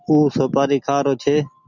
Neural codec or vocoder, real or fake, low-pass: none; real; 7.2 kHz